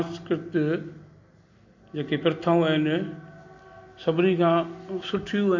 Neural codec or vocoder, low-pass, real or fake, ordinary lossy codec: none; 7.2 kHz; real; MP3, 48 kbps